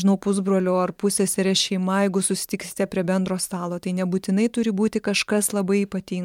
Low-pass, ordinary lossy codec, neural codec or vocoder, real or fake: 19.8 kHz; MP3, 96 kbps; vocoder, 44.1 kHz, 128 mel bands every 512 samples, BigVGAN v2; fake